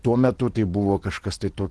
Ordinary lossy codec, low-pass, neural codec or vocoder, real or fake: Opus, 16 kbps; 10.8 kHz; none; real